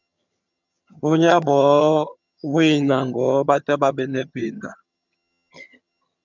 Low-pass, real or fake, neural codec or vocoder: 7.2 kHz; fake; vocoder, 22.05 kHz, 80 mel bands, HiFi-GAN